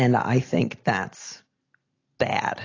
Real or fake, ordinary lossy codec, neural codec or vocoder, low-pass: real; AAC, 32 kbps; none; 7.2 kHz